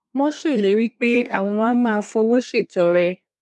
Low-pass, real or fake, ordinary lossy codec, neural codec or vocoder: none; fake; none; codec, 24 kHz, 1 kbps, SNAC